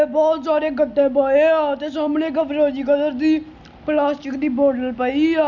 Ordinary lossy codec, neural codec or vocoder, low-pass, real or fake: none; none; 7.2 kHz; real